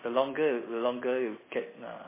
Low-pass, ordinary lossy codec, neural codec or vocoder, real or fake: 3.6 kHz; MP3, 16 kbps; none; real